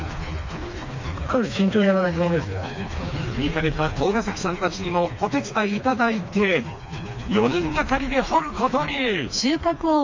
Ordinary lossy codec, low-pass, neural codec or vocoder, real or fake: MP3, 48 kbps; 7.2 kHz; codec, 16 kHz, 2 kbps, FreqCodec, smaller model; fake